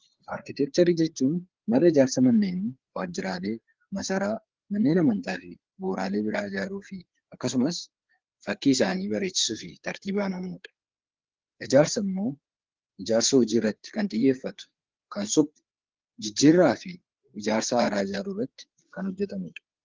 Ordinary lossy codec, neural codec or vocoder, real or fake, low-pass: Opus, 16 kbps; codec, 16 kHz, 4 kbps, FreqCodec, larger model; fake; 7.2 kHz